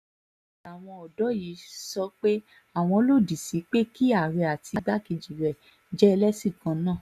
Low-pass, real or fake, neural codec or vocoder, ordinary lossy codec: 14.4 kHz; real; none; none